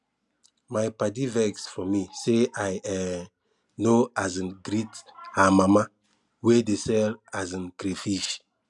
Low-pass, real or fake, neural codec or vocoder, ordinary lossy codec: 10.8 kHz; real; none; none